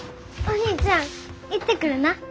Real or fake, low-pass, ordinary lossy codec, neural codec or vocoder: real; none; none; none